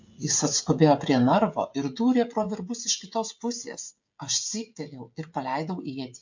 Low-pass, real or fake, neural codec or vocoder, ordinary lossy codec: 7.2 kHz; fake; codec, 16 kHz, 16 kbps, FreqCodec, smaller model; MP3, 48 kbps